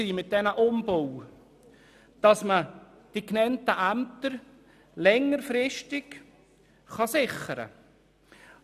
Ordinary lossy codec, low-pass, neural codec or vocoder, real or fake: none; 14.4 kHz; none; real